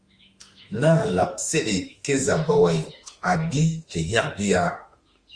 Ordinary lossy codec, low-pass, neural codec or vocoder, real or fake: Opus, 32 kbps; 9.9 kHz; autoencoder, 48 kHz, 32 numbers a frame, DAC-VAE, trained on Japanese speech; fake